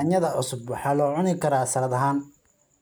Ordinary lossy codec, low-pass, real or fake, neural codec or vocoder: none; none; real; none